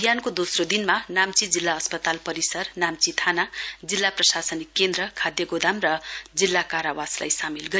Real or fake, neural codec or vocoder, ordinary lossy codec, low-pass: real; none; none; none